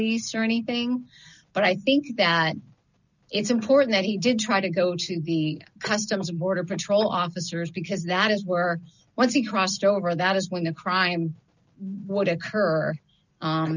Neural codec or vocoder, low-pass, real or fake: none; 7.2 kHz; real